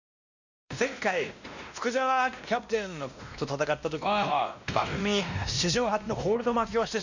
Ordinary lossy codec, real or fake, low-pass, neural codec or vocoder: none; fake; 7.2 kHz; codec, 16 kHz, 1 kbps, X-Codec, WavLM features, trained on Multilingual LibriSpeech